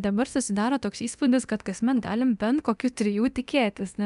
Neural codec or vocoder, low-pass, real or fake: codec, 24 kHz, 0.9 kbps, DualCodec; 10.8 kHz; fake